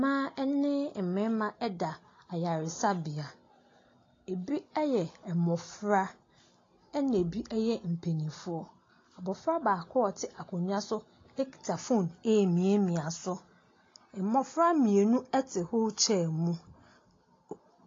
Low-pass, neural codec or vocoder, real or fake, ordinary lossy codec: 7.2 kHz; none; real; AAC, 32 kbps